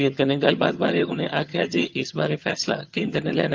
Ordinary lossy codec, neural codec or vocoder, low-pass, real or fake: Opus, 24 kbps; vocoder, 22.05 kHz, 80 mel bands, HiFi-GAN; 7.2 kHz; fake